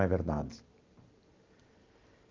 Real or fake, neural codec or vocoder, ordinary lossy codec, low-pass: real; none; Opus, 24 kbps; 7.2 kHz